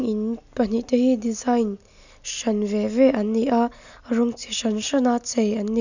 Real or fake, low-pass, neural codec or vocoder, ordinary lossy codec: real; 7.2 kHz; none; none